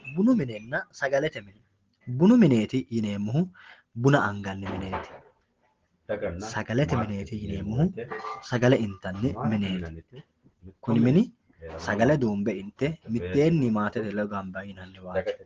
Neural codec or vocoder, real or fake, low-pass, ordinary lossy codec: none; real; 7.2 kHz; Opus, 16 kbps